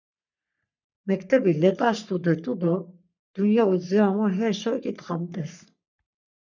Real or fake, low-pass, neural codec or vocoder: fake; 7.2 kHz; codec, 44.1 kHz, 3.4 kbps, Pupu-Codec